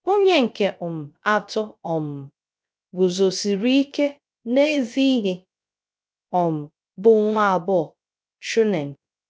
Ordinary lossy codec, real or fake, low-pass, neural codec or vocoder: none; fake; none; codec, 16 kHz, 0.3 kbps, FocalCodec